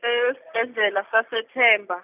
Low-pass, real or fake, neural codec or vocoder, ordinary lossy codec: 3.6 kHz; real; none; none